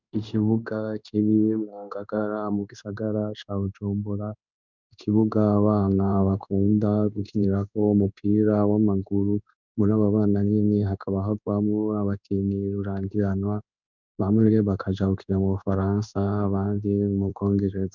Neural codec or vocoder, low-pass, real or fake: codec, 16 kHz in and 24 kHz out, 1 kbps, XY-Tokenizer; 7.2 kHz; fake